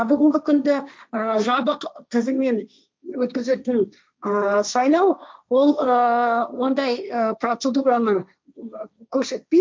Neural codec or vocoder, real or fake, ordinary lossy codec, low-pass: codec, 16 kHz, 1.1 kbps, Voila-Tokenizer; fake; none; none